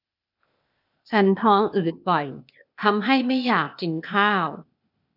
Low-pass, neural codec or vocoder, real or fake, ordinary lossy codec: 5.4 kHz; codec, 16 kHz, 0.8 kbps, ZipCodec; fake; none